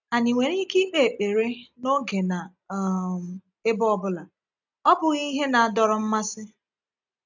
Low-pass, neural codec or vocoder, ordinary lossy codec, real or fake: 7.2 kHz; none; none; real